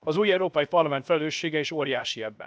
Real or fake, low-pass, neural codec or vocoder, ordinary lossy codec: fake; none; codec, 16 kHz, 0.7 kbps, FocalCodec; none